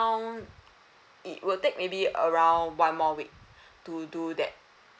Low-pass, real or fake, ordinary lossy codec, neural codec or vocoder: none; real; none; none